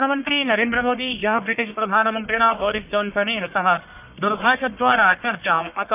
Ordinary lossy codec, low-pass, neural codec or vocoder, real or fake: none; 3.6 kHz; codec, 44.1 kHz, 1.7 kbps, Pupu-Codec; fake